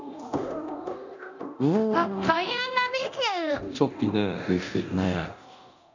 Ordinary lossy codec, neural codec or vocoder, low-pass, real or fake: none; codec, 24 kHz, 0.9 kbps, DualCodec; 7.2 kHz; fake